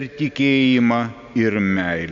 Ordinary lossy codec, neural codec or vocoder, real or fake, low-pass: Opus, 64 kbps; none; real; 7.2 kHz